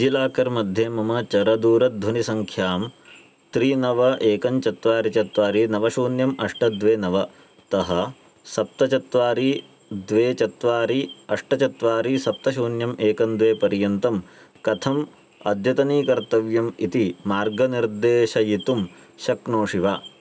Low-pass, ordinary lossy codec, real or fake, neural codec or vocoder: none; none; real; none